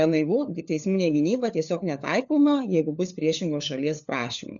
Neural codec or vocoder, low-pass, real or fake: codec, 16 kHz, 4 kbps, FunCodec, trained on LibriTTS, 50 frames a second; 7.2 kHz; fake